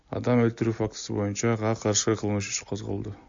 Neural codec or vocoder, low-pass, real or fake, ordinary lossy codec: none; 7.2 kHz; real; AAC, 64 kbps